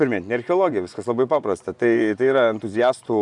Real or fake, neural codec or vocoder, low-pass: fake; vocoder, 24 kHz, 100 mel bands, Vocos; 10.8 kHz